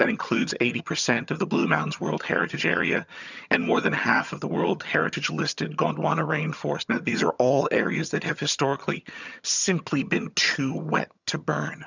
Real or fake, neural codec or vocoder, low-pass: fake; vocoder, 22.05 kHz, 80 mel bands, HiFi-GAN; 7.2 kHz